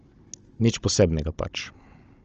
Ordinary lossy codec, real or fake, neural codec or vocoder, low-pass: Opus, 24 kbps; fake; codec, 16 kHz, 16 kbps, FunCodec, trained on Chinese and English, 50 frames a second; 7.2 kHz